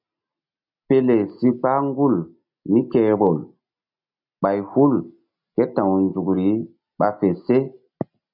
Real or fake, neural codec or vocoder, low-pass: real; none; 5.4 kHz